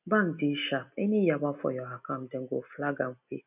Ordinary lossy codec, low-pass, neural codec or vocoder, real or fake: none; 3.6 kHz; none; real